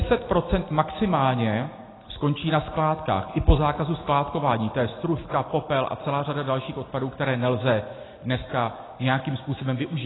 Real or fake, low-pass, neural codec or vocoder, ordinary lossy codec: real; 7.2 kHz; none; AAC, 16 kbps